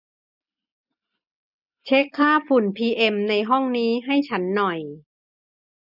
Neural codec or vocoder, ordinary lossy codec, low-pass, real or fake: none; none; 5.4 kHz; real